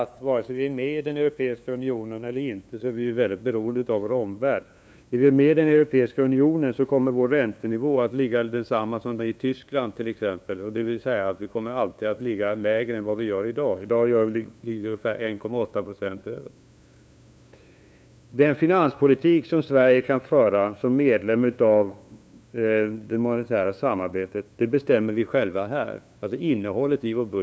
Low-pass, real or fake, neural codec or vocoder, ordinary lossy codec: none; fake; codec, 16 kHz, 2 kbps, FunCodec, trained on LibriTTS, 25 frames a second; none